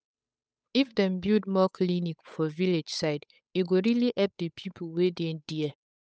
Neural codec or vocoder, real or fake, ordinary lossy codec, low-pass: codec, 16 kHz, 8 kbps, FunCodec, trained on Chinese and English, 25 frames a second; fake; none; none